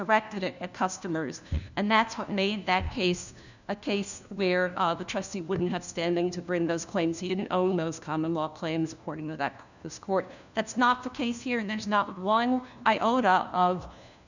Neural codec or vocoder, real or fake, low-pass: codec, 16 kHz, 1 kbps, FunCodec, trained on LibriTTS, 50 frames a second; fake; 7.2 kHz